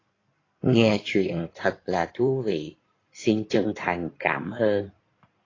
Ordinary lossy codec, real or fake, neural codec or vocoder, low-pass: AAC, 32 kbps; fake; codec, 16 kHz in and 24 kHz out, 2.2 kbps, FireRedTTS-2 codec; 7.2 kHz